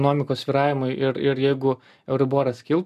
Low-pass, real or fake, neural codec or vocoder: 14.4 kHz; real; none